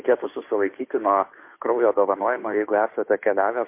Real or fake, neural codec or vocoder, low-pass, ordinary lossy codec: fake; codec, 16 kHz, 2 kbps, FunCodec, trained on Chinese and English, 25 frames a second; 3.6 kHz; MP3, 24 kbps